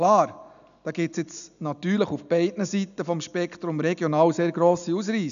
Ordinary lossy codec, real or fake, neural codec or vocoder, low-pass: none; real; none; 7.2 kHz